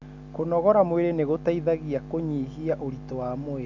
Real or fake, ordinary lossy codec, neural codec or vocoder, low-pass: real; none; none; 7.2 kHz